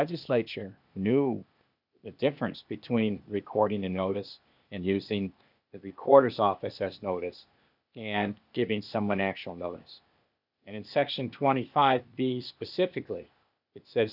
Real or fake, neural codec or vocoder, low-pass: fake; codec, 16 kHz, 0.8 kbps, ZipCodec; 5.4 kHz